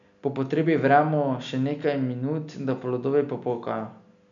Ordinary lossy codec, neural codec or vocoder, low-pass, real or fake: none; none; 7.2 kHz; real